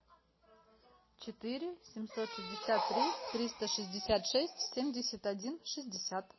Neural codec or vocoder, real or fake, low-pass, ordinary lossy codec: none; real; 7.2 kHz; MP3, 24 kbps